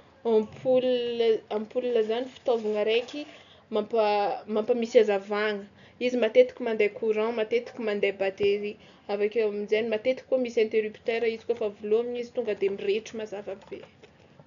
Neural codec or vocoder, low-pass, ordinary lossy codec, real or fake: none; 7.2 kHz; none; real